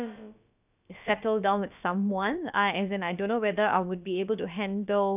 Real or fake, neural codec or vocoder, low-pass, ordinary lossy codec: fake; codec, 16 kHz, about 1 kbps, DyCAST, with the encoder's durations; 3.6 kHz; none